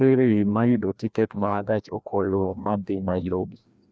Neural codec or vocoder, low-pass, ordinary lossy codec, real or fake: codec, 16 kHz, 1 kbps, FreqCodec, larger model; none; none; fake